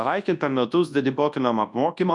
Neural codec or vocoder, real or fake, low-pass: codec, 24 kHz, 0.9 kbps, WavTokenizer, large speech release; fake; 10.8 kHz